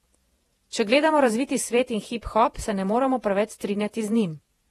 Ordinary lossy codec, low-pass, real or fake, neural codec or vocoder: AAC, 32 kbps; 19.8 kHz; fake; vocoder, 48 kHz, 128 mel bands, Vocos